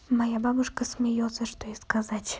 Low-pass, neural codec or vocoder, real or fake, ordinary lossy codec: none; none; real; none